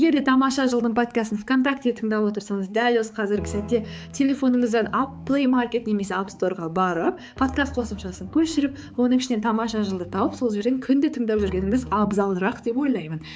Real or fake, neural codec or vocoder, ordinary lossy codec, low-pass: fake; codec, 16 kHz, 4 kbps, X-Codec, HuBERT features, trained on balanced general audio; none; none